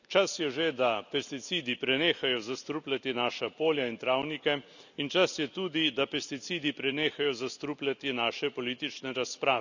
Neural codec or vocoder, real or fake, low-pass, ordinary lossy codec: none; real; 7.2 kHz; none